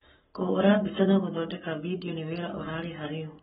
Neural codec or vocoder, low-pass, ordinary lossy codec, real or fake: codec, 44.1 kHz, 7.8 kbps, Pupu-Codec; 19.8 kHz; AAC, 16 kbps; fake